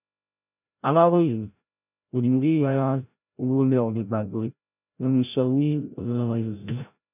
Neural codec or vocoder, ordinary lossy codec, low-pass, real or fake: codec, 16 kHz, 0.5 kbps, FreqCodec, larger model; none; 3.6 kHz; fake